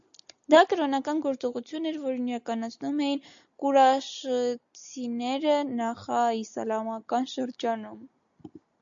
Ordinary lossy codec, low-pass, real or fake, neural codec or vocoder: AAC, 64 kbps; 7.2 kHz; real; none